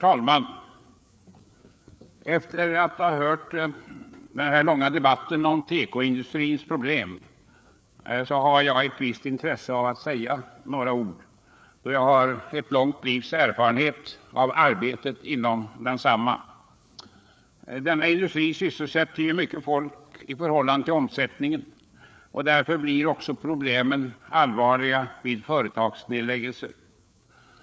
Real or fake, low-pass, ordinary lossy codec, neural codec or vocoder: fake; none; none; codec, 16 kHz, 4 kbps, FreqCodec, larger model